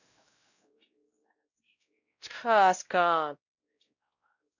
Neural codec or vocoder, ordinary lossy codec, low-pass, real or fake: codec, 16 kHz, 0.5 kbps, X-Codec, WavLM features, trained on Multilingual LibriSpeech; none; 7.2 kHz; fake